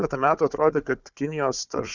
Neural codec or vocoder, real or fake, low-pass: codec, 16 kHz, 4 kbps, FunCodec, trained on Chinese and English, 50 frames a second; fake; 7.2 kHz